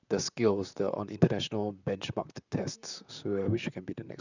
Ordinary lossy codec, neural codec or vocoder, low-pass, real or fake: none; vocoder, 44.1 kHz, 128 mel bands, Pupu-Vocoder; 7.2 kHz; fake